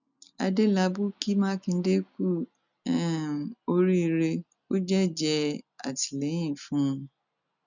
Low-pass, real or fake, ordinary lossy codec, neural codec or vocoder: 7.2 kHz; real; none; none